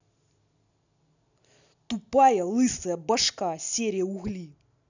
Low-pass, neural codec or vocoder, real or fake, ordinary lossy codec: 7.2 kHz; none; real; none